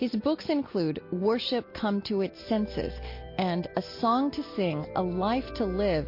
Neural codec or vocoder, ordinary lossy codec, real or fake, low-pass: none; MP3, 32 kbps; real; 5.4 kHz